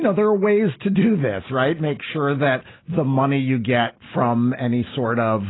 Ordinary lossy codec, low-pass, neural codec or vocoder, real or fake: AAC, 16 kbps; 7.2 kHz; none; real